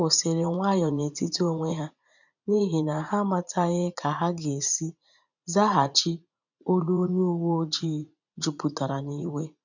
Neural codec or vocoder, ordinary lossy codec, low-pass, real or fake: vocoder, 44.1 kHz, 80 mel bands, Vocos; none; 7.2 kHz; fake